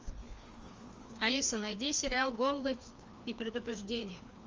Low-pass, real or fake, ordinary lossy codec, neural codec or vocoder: 7.2 kHz; fake; Opus, 32 kbps; codec, 16 kHz, 2 kbps, FreqCodec, larger model